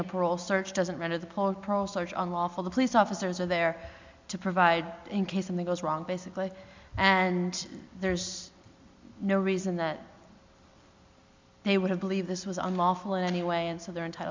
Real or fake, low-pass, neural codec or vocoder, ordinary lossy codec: real; 7.2 kHz; none; MP3, 64 kbps